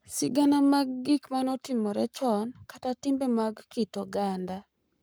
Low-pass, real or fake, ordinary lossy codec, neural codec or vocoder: none; fake; none; codec, 44.1 kHz, 7.8 kbps, Pupu-Codec